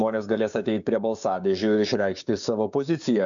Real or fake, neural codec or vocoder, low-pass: fake; codec, 16 kHz, 6 kbps, DAC; 7.2 kHz